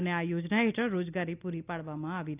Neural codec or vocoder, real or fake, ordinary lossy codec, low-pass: none; real; none; 3.6 kHz